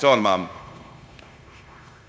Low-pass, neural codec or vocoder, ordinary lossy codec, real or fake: none; codec, 16 kHz, 0.9 kbps, LongCat-Audio-Codec; none; fake